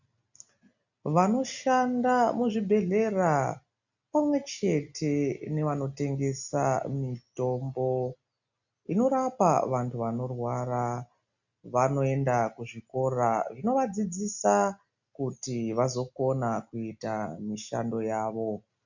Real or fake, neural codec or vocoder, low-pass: real; none; 7.2 kHz